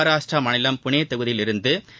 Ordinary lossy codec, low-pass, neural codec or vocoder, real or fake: none; none; none; real